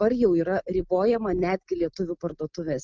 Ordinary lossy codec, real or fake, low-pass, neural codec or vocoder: Opus, 24 kbps; real; 7.2 kHz; none